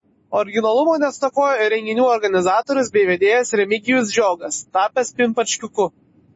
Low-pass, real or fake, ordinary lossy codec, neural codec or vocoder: 9.9 kHz; real; MP3, 32 kbps; none